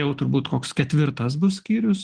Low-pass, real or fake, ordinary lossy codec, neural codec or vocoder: 9.9 kHz; real; Opus, 16 kbps; none